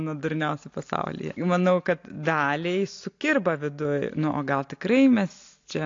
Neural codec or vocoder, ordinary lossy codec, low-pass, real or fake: none; AAC, 48 kbps; 7.2 kHz; real